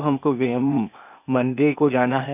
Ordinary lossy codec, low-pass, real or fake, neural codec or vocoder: none; 3.6 kHz; fake; codec, 16 kHz, 0.8 kbps, ZipCodec